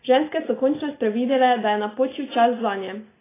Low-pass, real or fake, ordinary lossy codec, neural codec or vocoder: 3.6 kHz; real; AAC, 16 kbps; none